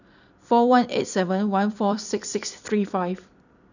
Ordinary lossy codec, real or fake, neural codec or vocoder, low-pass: none; fake; vocoder, 44.1 kHz, 128 mel bands every 256 samples, BigVGAN v2; 7.2 kHz